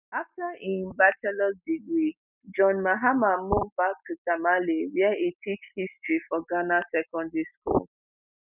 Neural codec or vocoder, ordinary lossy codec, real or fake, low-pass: none; none; real; 3.6 kHz